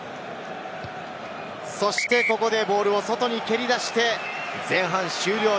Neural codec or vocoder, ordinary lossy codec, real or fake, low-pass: none; none; real; none